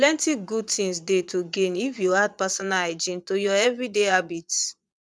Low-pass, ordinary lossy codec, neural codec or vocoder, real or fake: none; none; vocoder, 22.05 kHz, 80 mel bands, Vocos; fake